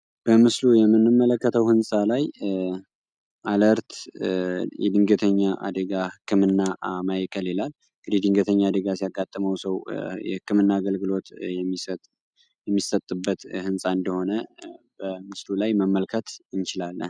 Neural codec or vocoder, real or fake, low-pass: none; real; 9.9 kHz